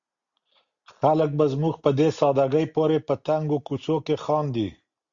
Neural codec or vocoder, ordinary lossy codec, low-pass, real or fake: none; Opus, 64 kbps; 7.2 kHz; real